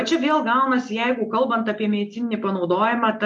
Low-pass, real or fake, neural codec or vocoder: 10.8 kHz; real; none